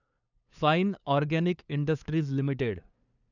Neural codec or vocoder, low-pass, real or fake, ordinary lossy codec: codec, 16 kHz, 2 kbps, FunCodec, trained on LibriTTS, 25 frames a second; 7.2 kHz; fake; none